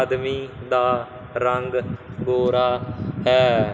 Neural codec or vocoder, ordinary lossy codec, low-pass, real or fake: none; none; none; real